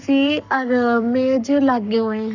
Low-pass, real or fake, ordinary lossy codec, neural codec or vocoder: 7.2 kHz; fake; none; codec, 44.1 kHz, 7.8 kbps, Pupu-Codec